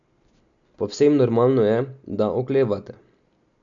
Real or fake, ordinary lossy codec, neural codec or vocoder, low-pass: real; none; none; 7.2 kHz